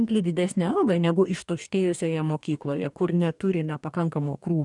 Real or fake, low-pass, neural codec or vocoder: fake; 10.8 kHz; codec, 44.1 kHz, 2.6 kbps, DAC